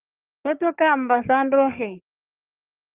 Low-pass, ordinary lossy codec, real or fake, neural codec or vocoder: 3.6 kHz; Opus, 32 kbps; fake; codec, 44.1 kHz, 3.4 kbps, Pupu-Codec